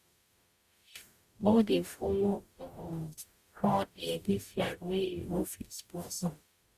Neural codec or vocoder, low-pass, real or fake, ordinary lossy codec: codec, 44.1 kHz, 0.9 kbps, DAC; 14.4 kHz; fake; Opus, 64 kbps